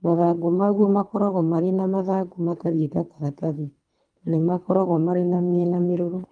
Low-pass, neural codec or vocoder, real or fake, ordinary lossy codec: 9.9 kHz; codec, 24 kHz, 3 kbps, HILCodec; fake; Opus, 32 kbps